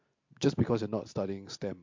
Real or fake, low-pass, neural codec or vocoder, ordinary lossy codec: real; 7.2 kHz; none; MP3, 48 kbps